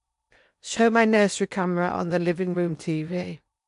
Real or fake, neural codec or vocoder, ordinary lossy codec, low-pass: fake; codec, 16 kHz in and 24 kHz out, 0.8 kbps, FocalCodec, streaming, 65536 codes; none; 10.8 kHz